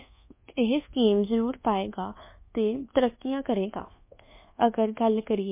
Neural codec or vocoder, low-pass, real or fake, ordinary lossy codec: codec, 24 kHz, 1.2 kbps, DualCodec; 3.6 kHz; fake; MP3, 24 kbps